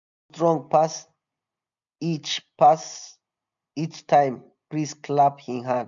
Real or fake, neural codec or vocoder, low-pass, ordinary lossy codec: real; none; 7.2 kHz; none